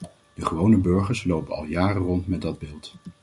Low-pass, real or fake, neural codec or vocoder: 10.8 kHz; real; none